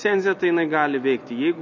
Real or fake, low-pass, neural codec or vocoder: real; 7.2 kHz; none